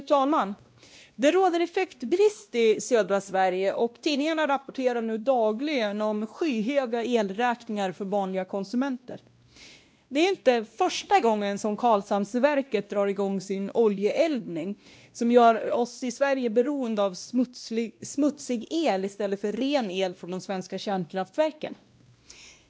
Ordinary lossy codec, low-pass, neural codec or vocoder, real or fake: none; none; codec, 16 kHz, 1 kbps, X-Codec, WavLM features, trained on Multilingual LibriSpeech; fake